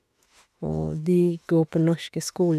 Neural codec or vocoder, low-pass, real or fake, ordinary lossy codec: autoencoder, 48 kHz, 32 numbers a frame, DAC-VAE, trained on Japanese speech; 14.4 kHz; fake; none